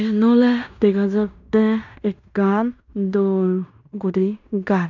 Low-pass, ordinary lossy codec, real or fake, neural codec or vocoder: 7.2 kHz; none; fake; codec, 16 kHz in and 24 kHz out, 0.9 kbps, LongCat-Audio-Codec, fine tuned four codebook decoder